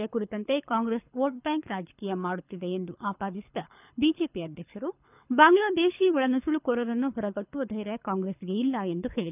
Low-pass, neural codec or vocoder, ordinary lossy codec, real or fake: 3.6 kHz; codec, 24 kHz, 6 kbps, HILCodec; none; fake